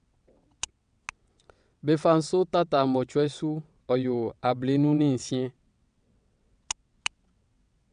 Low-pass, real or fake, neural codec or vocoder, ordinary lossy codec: 9.9 kHz; fake; vocoder, 22.05 kHz, 80 mel bands, WaveNeXt; none